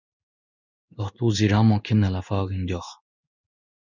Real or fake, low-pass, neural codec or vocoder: fake; 7.2 kHz; codec, 16 kHz in and 24 kHz out, 1 kbps, XY-Tokenizer